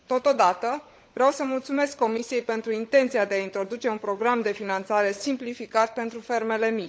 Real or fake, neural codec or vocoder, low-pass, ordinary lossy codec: fake; codec, 16 kHz, 16 kbps, FunCodec, trained on LibriTTS, 50 frames a second; none; none